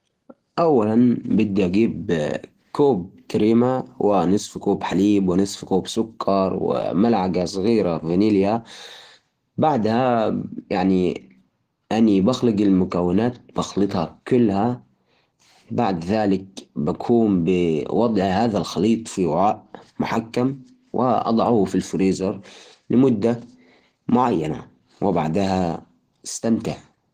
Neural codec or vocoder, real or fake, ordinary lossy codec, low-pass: none; real; Opus, 16 kbps; 14.4 kHz